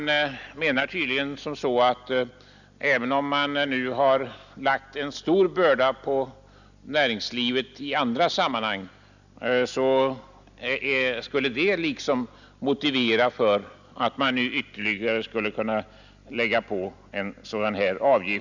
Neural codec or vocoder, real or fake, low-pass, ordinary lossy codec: none; real; 7.2 kHz; none